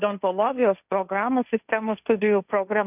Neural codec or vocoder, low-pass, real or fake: codec, 16 kHz, 1.1 kbps, Voila-Tokenizer; 3.6 kHz; fake